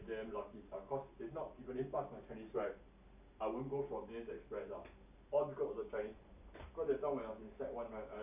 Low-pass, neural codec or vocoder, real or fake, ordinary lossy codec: 3.6 kHz; none; real; none